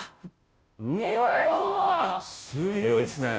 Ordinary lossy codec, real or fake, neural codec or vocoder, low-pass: none; fake; codec, 16 kHz, 0.5 kbps, FunCodec, trained on Chinese and English, 25 frames a second; none